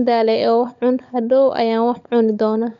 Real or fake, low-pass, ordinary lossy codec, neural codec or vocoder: fake; 7.2 kHz; none; codec, 16 kHz, 16 kbps, FunCodec, trained on LibriTTS, 50 frames a second